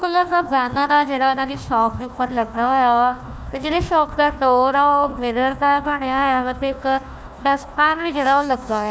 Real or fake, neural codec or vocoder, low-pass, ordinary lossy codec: fake; codec, 16 kHz, 1 kbps, FunCodec, trained on Chinese and English, 50 frames a second; none; none